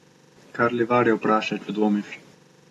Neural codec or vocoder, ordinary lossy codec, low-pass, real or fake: none; AAC, 32 kbps; 19.8 kHz; real